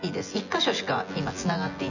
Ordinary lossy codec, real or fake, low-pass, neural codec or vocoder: none; fake; 7.2 kHz; vocoder, 24 kHz, 100 mel bands, Vocos